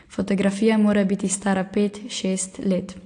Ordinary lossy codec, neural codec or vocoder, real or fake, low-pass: AAC, 48 kbps; none; real; 9.9 kHz